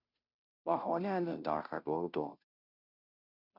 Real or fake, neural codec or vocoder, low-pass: fake; codec, 16 kHz, 0.5 kbps, FunCodec, trained on Chinese and English, 25 frames a second; 5.4 kHz